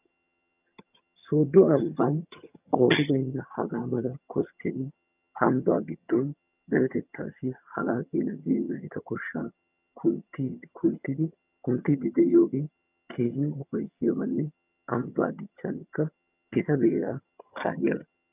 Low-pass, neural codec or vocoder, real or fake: 3.6 kHz; vocoder, 22.05 kHz, 80 mel bands, HiFi-GAN; fake